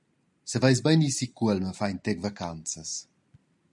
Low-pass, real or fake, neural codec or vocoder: 10.8 kHz; real; none